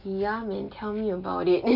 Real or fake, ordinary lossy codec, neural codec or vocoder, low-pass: real; none; none; 5.4 kHz